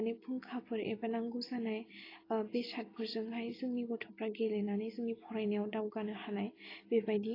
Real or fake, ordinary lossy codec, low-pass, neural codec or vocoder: real; AAC, 24 kbps; 5.4 kHz; none